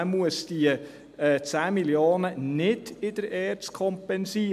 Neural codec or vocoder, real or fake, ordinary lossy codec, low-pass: none; real; MP3, 96 kbps; 14.4 kHz